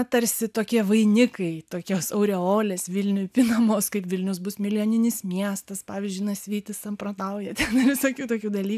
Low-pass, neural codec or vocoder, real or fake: 14.4 kHz; none; real